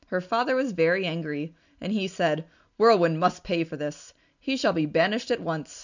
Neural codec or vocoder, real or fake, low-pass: none; real; 7.2 kHz